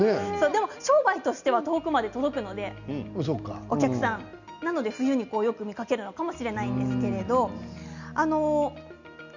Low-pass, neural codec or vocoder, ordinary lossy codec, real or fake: 7.2 kHz; none; none; real